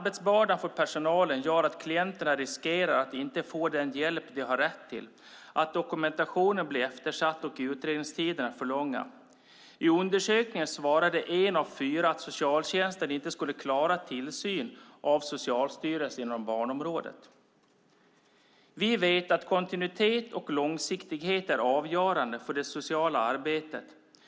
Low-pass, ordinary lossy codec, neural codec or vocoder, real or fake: none; none; none; real